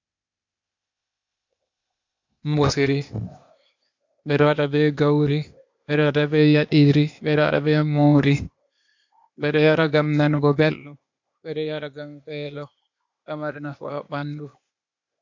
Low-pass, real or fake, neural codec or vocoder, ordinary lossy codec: 7.2 kHz; fake; codec, 16 kHz, 0.8 kbps, ZipCodec; MP3, 64 kbps